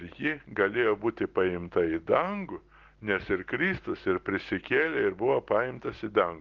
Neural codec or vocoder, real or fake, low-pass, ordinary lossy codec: none; real; 7.2 kHz; Opus, 16 kbps